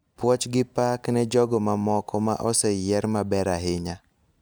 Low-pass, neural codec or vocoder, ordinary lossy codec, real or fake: none; none; none; real